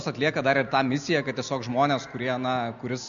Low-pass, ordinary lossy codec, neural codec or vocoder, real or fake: 7.2 kHz; MP3, 96 kbps; none; real